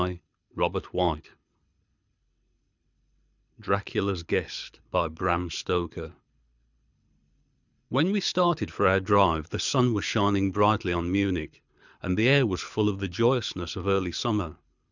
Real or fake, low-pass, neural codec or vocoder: fake; 7.2 kHz; codec, 24 kHz, 6 kbps, HILCodec